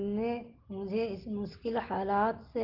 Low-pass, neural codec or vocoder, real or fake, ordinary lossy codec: 5.4 kHz; none; real; Opus, 16 kbps